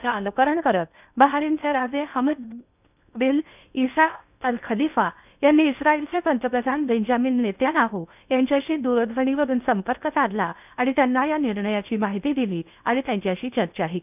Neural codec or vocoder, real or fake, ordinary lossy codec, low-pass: codec, 16 kHz in and 24 kHz out, 0.8 kbps, FocalCodec, streaming, 65536 codes; fake; none; 3.6 kHz